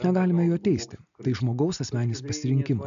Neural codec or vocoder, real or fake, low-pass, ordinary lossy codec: none; real; 7.2 kHz; AAC, 96 kbps